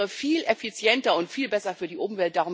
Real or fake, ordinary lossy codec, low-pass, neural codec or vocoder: real; none; none; none